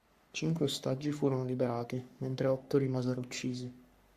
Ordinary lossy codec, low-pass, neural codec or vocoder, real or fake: Opus, 64 kbps; 14.4 kHz; codec, 44.1 kHz, 3.4 kbps, Pupu-Codec; fake